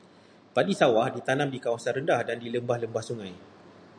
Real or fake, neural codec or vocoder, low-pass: real; none; 9.9 kHz